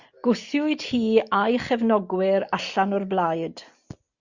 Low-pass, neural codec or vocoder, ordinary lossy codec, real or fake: 7.2 kHz; none; Opus, 64 kbps; real